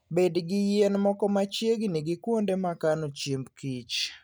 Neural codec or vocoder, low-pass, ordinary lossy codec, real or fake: none; none; none; real